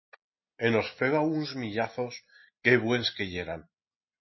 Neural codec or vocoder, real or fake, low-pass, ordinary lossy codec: none; real; 7.2 kHz; MP3, 24 kbps